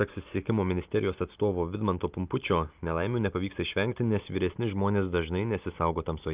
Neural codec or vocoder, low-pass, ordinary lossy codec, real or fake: none; 3.6 kHz; Opus, 24 kbps; real